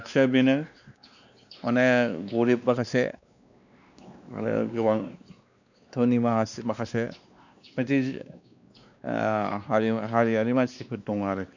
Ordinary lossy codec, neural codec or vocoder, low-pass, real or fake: none; codec, 16 kHz, 2 kbps, X-Codec, WavLM features, trained on Multilingual LibriSpeech; 7.2 kHz; fake